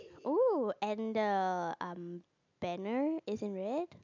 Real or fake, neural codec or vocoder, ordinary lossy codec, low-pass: real; none; none; 7.2 kHz